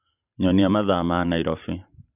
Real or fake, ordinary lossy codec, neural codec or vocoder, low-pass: fake; none; vocoder, 44.1 kHz, 128 mel bands every 512 samples, BigVGAN v2; 3.6 kHz